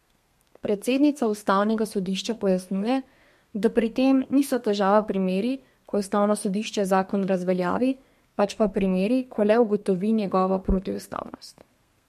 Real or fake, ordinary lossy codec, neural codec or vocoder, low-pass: fake; MP3, 64 kbps; codec, 32 kHz, 1.9 kbps, SNAC; 14.4 kHz